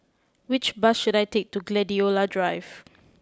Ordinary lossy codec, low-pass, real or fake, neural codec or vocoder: none; none; real; none